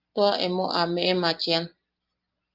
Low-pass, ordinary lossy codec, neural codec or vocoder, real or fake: 5.4 kHz; Opus, 32 kbps; none; real